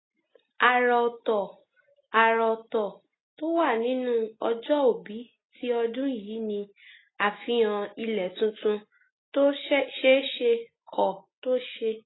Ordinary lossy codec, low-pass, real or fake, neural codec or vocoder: AAC, 16 kbps; 7.2 kHz; real; none